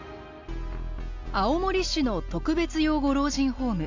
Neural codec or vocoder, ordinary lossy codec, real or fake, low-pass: none; MP3, 64 kbps; real; 7.2 kHz